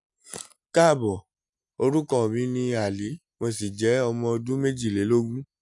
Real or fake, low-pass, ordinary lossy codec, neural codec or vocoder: real; 10.8 kHz; none; none